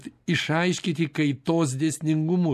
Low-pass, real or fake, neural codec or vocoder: 14.4 kHz; real; none